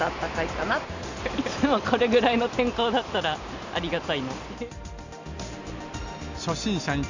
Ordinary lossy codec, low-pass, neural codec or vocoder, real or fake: Opus, 64 kbps; 7.2 kHz; none; real